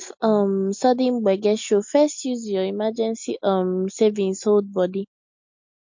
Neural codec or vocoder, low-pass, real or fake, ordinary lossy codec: none; 7.2 kHz; real; MP3, 48 kbps